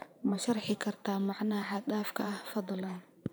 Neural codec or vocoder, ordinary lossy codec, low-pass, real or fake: vocoder, 44.1 kHz, 128 mel bands every 512 samples, BigVGAN v2; none; none; fake